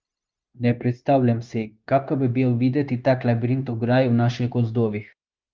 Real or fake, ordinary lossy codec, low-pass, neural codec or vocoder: fake; Opus, 32 kbps; 7.2 kHz; codec, 16 kHz, 0.9 kbps, LongCat-Audio-Codec